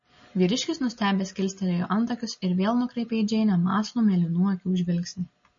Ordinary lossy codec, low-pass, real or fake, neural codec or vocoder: MP3, 32 kbps; 7.2 kHz; real; none